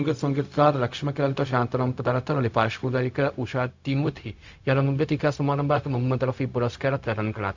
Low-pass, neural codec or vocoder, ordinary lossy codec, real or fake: 7.2 kHz; codec, 16 kHz, 0.4 kbps, LongCat-Audio-Codec; MP3, 64 kbps; fake